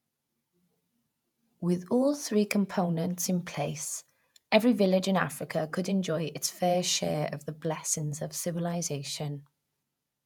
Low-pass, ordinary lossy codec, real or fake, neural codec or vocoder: 19.8 kHz; none; fake; vocoder, 48 kHz, 128 mel bands, Vocos